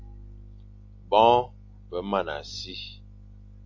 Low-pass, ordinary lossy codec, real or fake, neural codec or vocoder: 7.2 kHz; AAC, 48 kbps; real; none